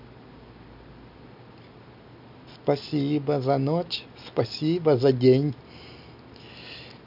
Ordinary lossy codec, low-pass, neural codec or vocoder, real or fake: none; 5.4 kHz; none; real